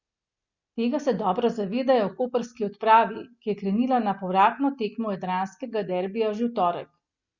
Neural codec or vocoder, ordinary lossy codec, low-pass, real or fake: none; Opus, 64 kbps; 7.2 kHz; real